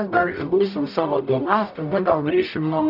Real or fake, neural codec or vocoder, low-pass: fake; codec, 44.1 kHz, 0.9 kbps, DAC; 5.4 kHz